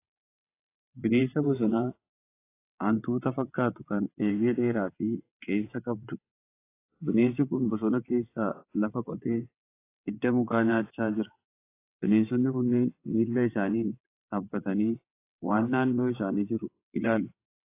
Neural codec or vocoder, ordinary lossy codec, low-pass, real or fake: vocoder, 22.05 kHz, 80 mel bands, WaveNeXt; AAC, 24 kbps; 3.6 kHz; fake